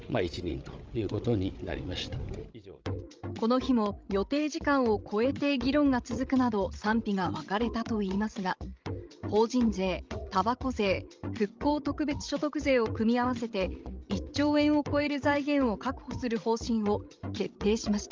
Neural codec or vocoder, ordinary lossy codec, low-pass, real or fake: codec, 16 kHz, 16 kbps, FreqCodec, larger model; Opus, 24 kbps; 7.2 kHz; fake